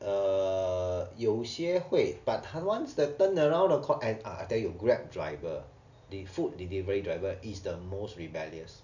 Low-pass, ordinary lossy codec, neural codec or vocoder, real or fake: 7.2 kHz; none; none; real